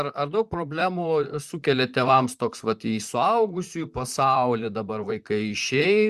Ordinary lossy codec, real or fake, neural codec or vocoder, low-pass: Opus, 64 kbps; fake; vocoder, 44.1 kHz, 128 mel bands, Pupu-Vocoder; 14.4 kHz